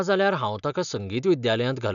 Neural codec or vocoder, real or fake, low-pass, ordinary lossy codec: none; real; 7.2 kHz; none